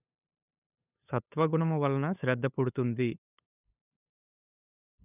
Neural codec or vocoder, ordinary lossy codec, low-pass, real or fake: codec, 16 kHz, 8 kbps, FunCodec, trained on LibriTTS, 25 frames a second; none; 3.6 kHz; fake